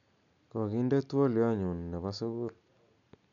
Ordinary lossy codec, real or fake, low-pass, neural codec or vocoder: none; real; 7.2 kHz; none